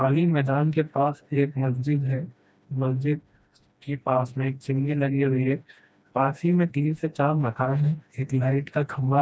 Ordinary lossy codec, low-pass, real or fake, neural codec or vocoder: none; none; fake; codec, 16 kHz, 1 kbps, FreqCodec, smaller model